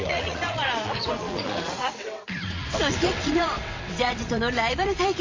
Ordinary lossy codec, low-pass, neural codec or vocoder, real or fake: MP3, 32 kbps; 7.2 kHz; vocoder, 22.05 kHz, 80 mel bands, WaveNeXt; fake